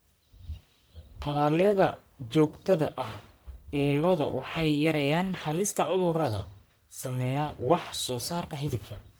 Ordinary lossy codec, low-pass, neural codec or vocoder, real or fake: none; none; codec, 44.1 kHz, 1.7 kbps, Pupu-Codec; fake